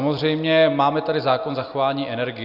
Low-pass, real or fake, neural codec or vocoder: 5.4 kHz; real; none